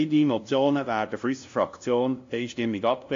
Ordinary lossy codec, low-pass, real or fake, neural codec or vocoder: AAC, 48 kbps; 7.2 kHz; fake; codec, 16 kHz, 0.5 kbps, FunCodec, trained on LibriTTS, 25 frames a second